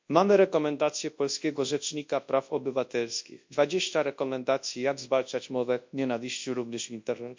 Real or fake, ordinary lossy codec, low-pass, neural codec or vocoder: fake; MP3, 48 kbps; 7.2 kHz; codec, 24 kHz, 0.9 kbps, WavTokenizer, large speech release